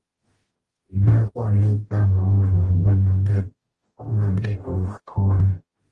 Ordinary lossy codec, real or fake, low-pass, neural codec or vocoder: Opus, 24 kbps; fake; 10.8 kHz; codec, 44.1 kHz, 0.9 kbps, DAC